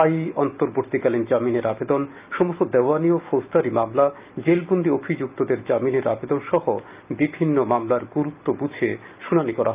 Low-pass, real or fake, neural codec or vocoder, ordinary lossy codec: 3.6 kHz; real; none; Opus, 24 kbps